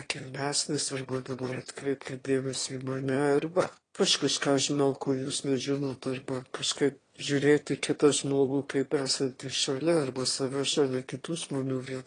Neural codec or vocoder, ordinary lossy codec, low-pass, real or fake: autoencoder, 22.05 kHz, a latent of 192 numbers a frame, VITS, trained on one speaker; AAC, 32 kbps; 9.9 kHz; fake